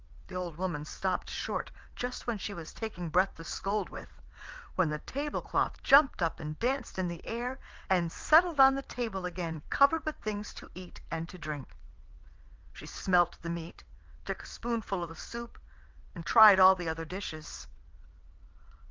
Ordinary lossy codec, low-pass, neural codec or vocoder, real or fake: Opus, 32 kbps; 7.2 kHz; vocoder, 22.05 kHz, 80 mel bands, WaveNeXt; fake